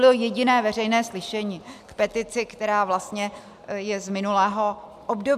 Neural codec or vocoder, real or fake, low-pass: none; real; 14.4 kHz